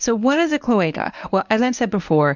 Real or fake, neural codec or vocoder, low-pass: fake; codec, 24 kHz, 0.9 kbps, WavTokenizer, medium speech release version 1; 7.2 kHz